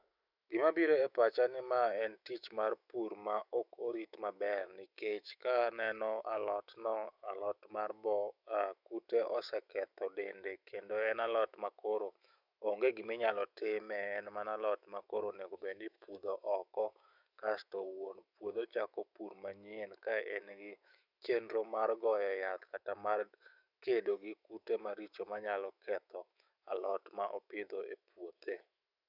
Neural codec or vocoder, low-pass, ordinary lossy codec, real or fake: autoencoder, 48 kHz, 128 numbers a frame, DAC-VAE, trained on Japanese speech; 5.4 kHz; Opus, 64 kbps; fake